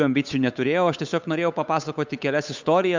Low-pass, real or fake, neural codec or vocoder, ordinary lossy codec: 7.2 kHz; fake; codec, 16 kHz, 8 kbps, FunCodec, trained on Chinese and English, 25 frames a second; MP3, 64 kbps